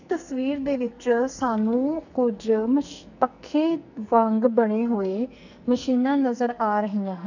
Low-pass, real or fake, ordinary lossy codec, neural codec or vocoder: 7.2 kHz; fake; none; codec, 44.1 kHz, 2.6 kbps, SNAC